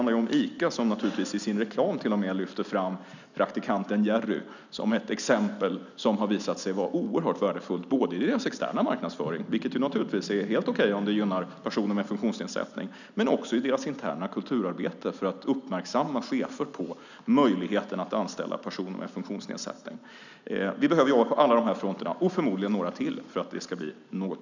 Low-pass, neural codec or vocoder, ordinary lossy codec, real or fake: 7.2 kHz; none; none; real